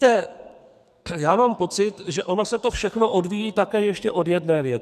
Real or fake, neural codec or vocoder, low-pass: fake; codec, 44.1 kHz, 2.6 kbps, SNAC; 14.4 kHz